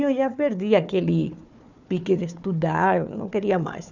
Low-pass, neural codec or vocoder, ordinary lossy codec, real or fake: 7.2 kHz; codec, 16 kHz, 4 kbps, FunCodec, trained on Chinese and English, 50 frames a second; none; fake